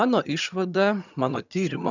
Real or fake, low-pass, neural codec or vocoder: fake; 7.2 kHz; vocoder, 22.05 kHz, 80 mel bands, HiFi-GAN